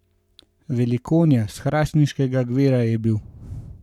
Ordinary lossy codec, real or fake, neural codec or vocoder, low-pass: none; fake; codec, 44.1 kHz, 7.8 kbps, Pupu-Codec; 19.8 kHz